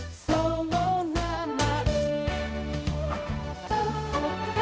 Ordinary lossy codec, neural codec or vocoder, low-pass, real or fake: none; codec, 16 kHz, 0.5 kbps, X-Codec, HuBERT features, trained on balanced general audio; none; fake